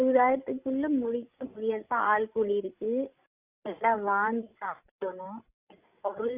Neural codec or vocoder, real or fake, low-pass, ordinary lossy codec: codec, 16 kHz, 16 kbps, FreqCodec, larger model; fake; 3.6 kHz; Opus, 64 kbps